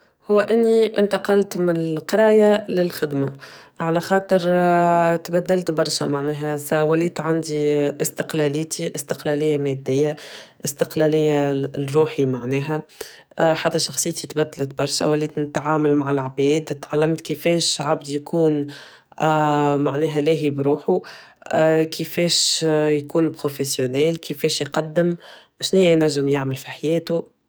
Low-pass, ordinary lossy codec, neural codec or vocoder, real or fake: none; none; codec, 44.1 kHz, 2.6 kbps, SNAC; fake